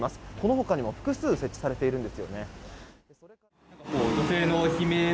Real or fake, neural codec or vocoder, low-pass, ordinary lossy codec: real; none; none; none